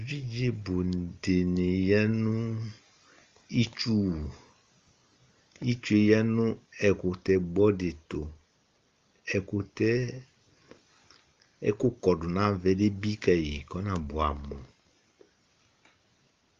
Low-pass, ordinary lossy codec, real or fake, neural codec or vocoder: 7.2 kHz; Opus, 32 kbps; real; none